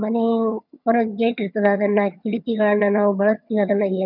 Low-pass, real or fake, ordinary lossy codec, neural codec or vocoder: 5.4 kHz; fake; none; vocoder, 22.05 kHz, 80 mel bands, HiFi-GAN